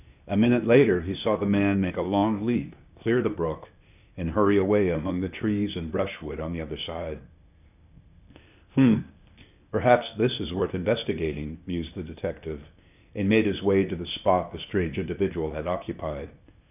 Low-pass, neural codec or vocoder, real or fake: 3.6 kHz; codec, 16 kHz, 0.8 kbps, ZipCodec; fake